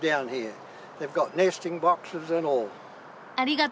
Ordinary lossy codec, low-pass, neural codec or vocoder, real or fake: none; none; none; real